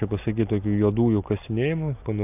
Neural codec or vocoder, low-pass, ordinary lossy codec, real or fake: none; 3.6 kHz; AAC, 32 kbps; real